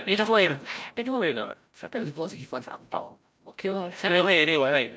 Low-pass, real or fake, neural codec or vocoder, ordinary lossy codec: none; fake; codec, 16 kHz, 0.5 kbps, FreqCodec, larger model; none